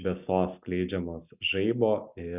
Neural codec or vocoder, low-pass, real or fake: none; 3.6 kHz; real